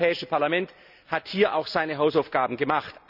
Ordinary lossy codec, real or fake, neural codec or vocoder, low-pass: none; real; none; 5.4 kHz